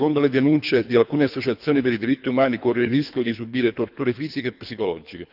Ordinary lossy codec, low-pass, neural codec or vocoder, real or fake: none; 5.4 kHz; codec, 24 kHz, 3 kbps, HILCodec; fake